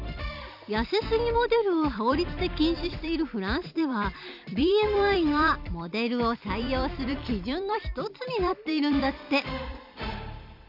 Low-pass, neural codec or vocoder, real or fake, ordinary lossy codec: 5.4 kHz; vocoder, 44.1 kHz, 128 mel bands every 256 samples, BigVGAN v2; fake; none